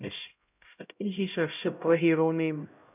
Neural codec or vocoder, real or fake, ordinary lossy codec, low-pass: codec, 16 kHz, 0.5 kbps, X-Codec, HuBERT features, trained on LibriSpeech; fake; none; 3.6 kHz